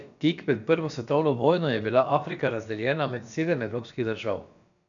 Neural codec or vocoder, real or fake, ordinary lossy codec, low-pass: codec, 16 kHz, about 1 kbps, DyCAST, with the encoder's durations; fake; none; 7.2 kHz